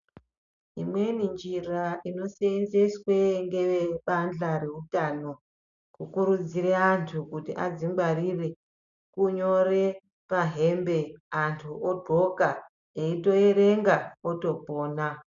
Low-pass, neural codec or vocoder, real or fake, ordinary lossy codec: 7.2 kHz; none; real; MP3, 96 kbps